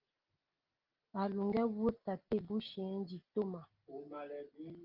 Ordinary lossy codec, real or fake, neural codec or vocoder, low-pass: Opus, 32 kbps; real; none; 5.4 kHz